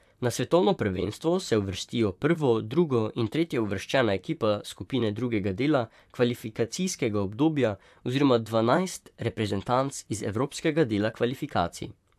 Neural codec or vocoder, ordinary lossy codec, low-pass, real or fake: vocoder, 44.1 kHz, 128 mel bands, Pupu-Vocoder; AAC, 96 kbps; 14.4 kHz; fake